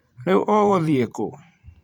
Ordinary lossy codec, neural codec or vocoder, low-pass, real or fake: none; vocoder, 44.1 kHz, 128 mel bands every 512 samples, BigVGAN v2; 19.8 kHz; fake